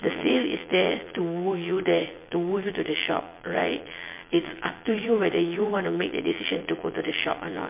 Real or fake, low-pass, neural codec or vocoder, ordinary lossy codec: fake; 3.6 kHz; vocoder, 22.05 kHz, 80 mel bands, Vocos; MP3, 24 kbps